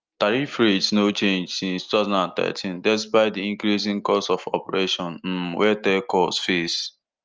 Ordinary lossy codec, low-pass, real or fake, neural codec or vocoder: Opus, 24 kbps; 7.2 kHz; real; none